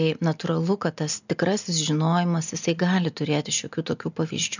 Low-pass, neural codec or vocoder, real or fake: 7.2 kHz; none; real